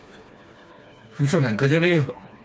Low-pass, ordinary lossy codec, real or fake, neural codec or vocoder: none; none; fake; codec, 16 kHz, 2 kbps, FreqCodec, smaller model